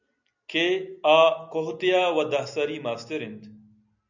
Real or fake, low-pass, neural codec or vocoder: real; 7.2 kHz; none